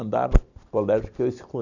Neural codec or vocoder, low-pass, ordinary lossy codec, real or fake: none; 7.2 kHz; none; real